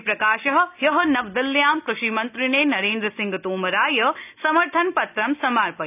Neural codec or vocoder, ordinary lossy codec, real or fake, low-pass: none; none; real; 3.6 kHz